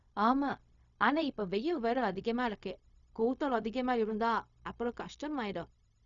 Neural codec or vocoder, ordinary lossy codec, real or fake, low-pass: codec, 16 kHz, 0.4 kbps, LongCat-Audio-Codec; none; fake; 7.2 kHz